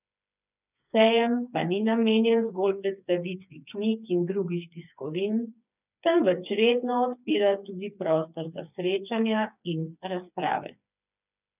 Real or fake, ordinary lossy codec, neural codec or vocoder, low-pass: fake; none; codec, 16 kHz, 4 kbps, FreqCodec, smaller model; 3.6 kHz